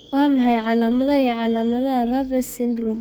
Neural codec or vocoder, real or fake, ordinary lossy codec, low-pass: codec, 44.1 kHz, 2.6 kbps, SNAC; fake; none; none